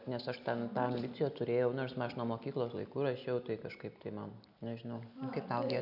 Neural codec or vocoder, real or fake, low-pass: none; real; 5.4 kHz